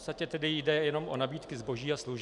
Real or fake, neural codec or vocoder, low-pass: real; none; 10.8 kHz